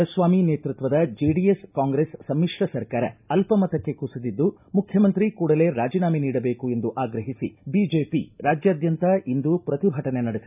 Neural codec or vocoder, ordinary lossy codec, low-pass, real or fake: none; none; 3.6 kHz; real